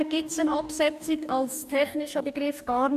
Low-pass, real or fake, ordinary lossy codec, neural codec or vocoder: 14.4 kHz; fake; none; codec, 44.1 kHz, 2.6 kbps, DAC